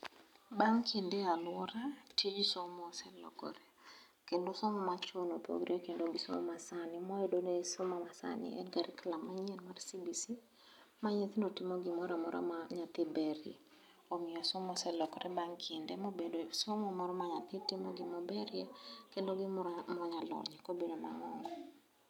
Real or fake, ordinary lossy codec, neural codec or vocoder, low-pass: real; none; none; 19.8 kHz